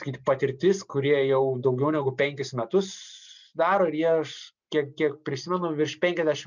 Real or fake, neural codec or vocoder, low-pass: real; none; 7.2 kHz